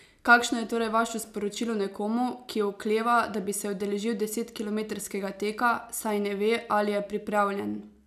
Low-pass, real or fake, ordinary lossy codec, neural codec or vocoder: 14.4 kHz; real; none; none